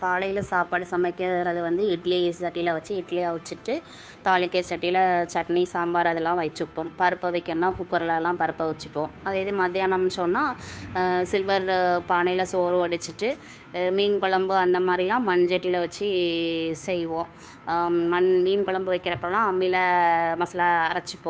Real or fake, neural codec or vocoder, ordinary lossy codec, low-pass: fake; codec, 16 kHz, 2 kbps, FunCodec, trained on Chinese and English, 25 frames a second; none; none